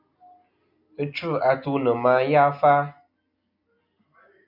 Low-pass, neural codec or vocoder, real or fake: 5.4 kHz; none; real